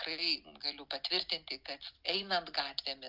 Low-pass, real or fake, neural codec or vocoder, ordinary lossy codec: 5.4 kHz; real; none; Opus, 24 kbps